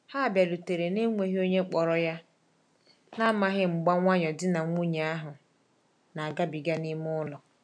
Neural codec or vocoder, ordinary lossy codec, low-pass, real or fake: none; none; 9.9 kHz; real